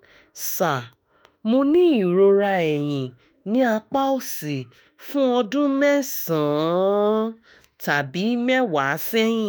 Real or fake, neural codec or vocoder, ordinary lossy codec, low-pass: fake; autoencoder, 48 kHz, 32 numbers a frame, DAC-VAE, trained on Japanese speech; none; none